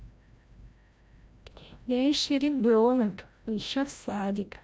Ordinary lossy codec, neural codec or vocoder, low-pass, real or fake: none; codec, 16 kHz, 0.5 kbps, FreqCodec, larger model; none; fake